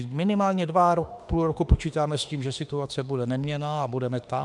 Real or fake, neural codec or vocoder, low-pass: fake; autoencoder, 48 kHz, 32 numbers a frame, DAC-VAE, trained on Japanese speech; 10.8 kHz